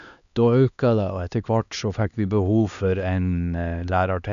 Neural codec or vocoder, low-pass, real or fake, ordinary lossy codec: codec, 16 kHz, 2 kbps, X-Codec, HuBERT features, trained on LibriSpeech; 7.2 kHz; fake; none